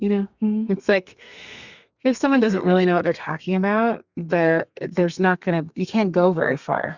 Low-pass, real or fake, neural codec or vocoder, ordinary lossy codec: 7.2 kHz; fake; codec, 32 kHz, 1.9 kbps, SNAC; Opus, 64 kbps